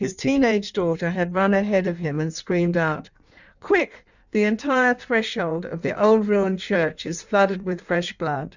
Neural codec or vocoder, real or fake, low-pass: codec, 16 kHz in and 24 kHz out, 1.1 kbps, FireRedTTS-2 codec; fake; 7.2 kHz